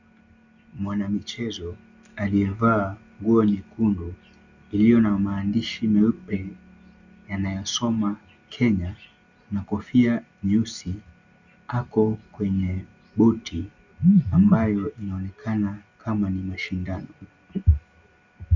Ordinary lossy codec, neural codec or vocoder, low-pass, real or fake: Opus, 64 kbps; none; 7.2 kHz; real